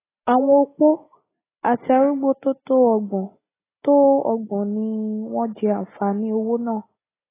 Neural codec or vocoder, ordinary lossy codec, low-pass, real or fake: none; AAC, 16 kbps; 3.6 kHz; real